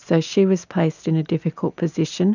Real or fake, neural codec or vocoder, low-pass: real; none; 7.2 kHz